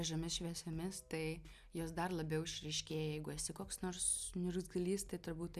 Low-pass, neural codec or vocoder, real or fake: 14.4 kHz; none; real